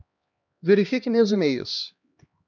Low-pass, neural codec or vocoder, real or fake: 7.2 kHz; codec, 16 kHz, 1 kbps, X-Codec, HuBERT features, trained on LibriSpeech; fake